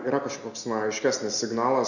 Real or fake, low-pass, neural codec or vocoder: real; 7.2 kHz; none